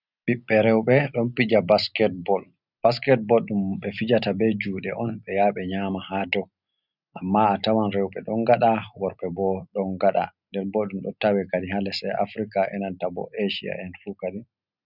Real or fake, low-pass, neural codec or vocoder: real; 5.4 kHz; none